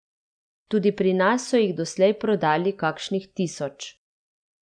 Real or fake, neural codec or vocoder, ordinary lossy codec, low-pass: real; none; none; 9.9 kHz